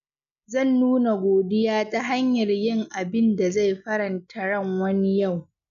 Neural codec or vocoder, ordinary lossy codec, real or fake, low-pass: none; none; real; 7.2 kHz